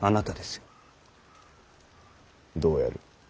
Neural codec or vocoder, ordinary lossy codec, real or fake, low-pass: none; none; real; none